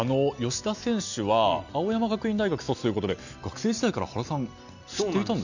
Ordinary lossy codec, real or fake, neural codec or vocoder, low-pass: none; real; none; 7.2 kHz